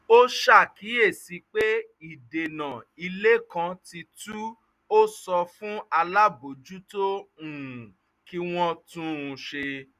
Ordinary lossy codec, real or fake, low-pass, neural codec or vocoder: none; real; 14.4 kHz; none